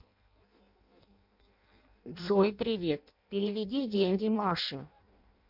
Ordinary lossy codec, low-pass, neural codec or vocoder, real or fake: MP3, 48 kbps; 5.4 kHz; codec, 16 kHz in and 24 kHz out, 0.6 kbps, FireRedTTS-2 codec; fake